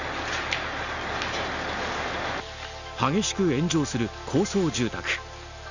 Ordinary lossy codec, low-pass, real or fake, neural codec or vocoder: none; 7.2 kHz; real; none